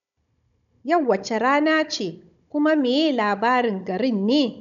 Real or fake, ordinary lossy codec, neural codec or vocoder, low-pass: fake; none; codec, 16 kHz, 16 kbps, FunCodec, trained on Chinese and English, 50 frames a second; 7.2 kHz